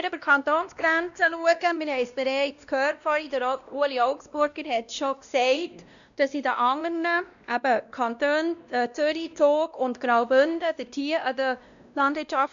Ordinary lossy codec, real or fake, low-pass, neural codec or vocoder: MP3, 96 kbps; fake; 7.2 kHz; codec, 16 kHz, 1 kbps, X-Codec, WavLM features, trained on Multilingual LibriSpeech